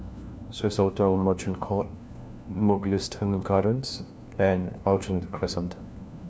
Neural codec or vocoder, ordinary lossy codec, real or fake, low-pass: codec, 16 kHz, 1 kbps, FunCodec, trained on LibriTTS, 50 frames a second; none; fake; none